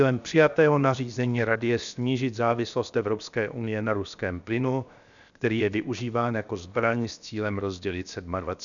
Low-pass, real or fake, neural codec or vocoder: 7.2 kHz; fake; codec, 16 kHz, 0.7 kbps, FocalCodec